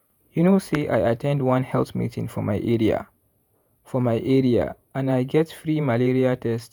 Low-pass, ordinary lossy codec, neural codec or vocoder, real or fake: none; none; vocoder, 48 kHz, 128 mel bands, Vocos; fake